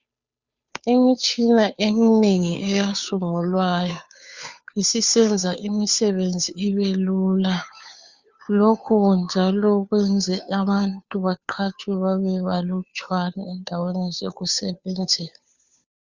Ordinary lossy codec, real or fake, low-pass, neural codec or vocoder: Opus, 64 kbps; fake; 7.2 kHz; codec, 16 kHz, 2 kbps, FunCodec, trained on Chinese and English, 25 frames a second